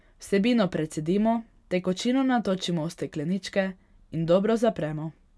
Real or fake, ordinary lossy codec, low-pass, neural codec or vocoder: real; none; none; none